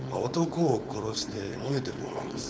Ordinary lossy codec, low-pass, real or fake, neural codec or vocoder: none; none; fake; codec, 16 kHz, 4.8 kbps, FACodec